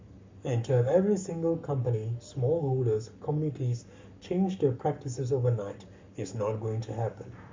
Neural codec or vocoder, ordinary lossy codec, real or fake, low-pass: codec, 44.1 kHz, 7.8 kbps, Pupu-Codec; MP3, 64 kbps; fake; 7.2 kHz